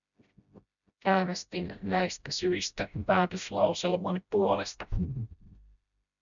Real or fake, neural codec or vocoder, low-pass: fake; codec, 16 kHz, 0.5 kbps, FreqCodec, smaller model; 7.2 kHz